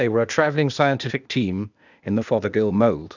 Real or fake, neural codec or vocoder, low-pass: fake; codec, 16 kHz, 0.8 kbps, ZipCodec; 7.2 kHz